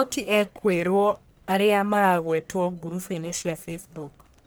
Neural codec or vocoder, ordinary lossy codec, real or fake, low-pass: codec, 44.1 kHz, 1.7 kbps, Pupu-Codec; none; fake; none